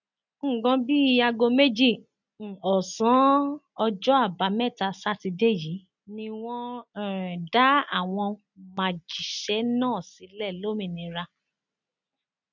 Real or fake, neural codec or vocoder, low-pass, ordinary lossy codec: real; none; 7.2 kHz; none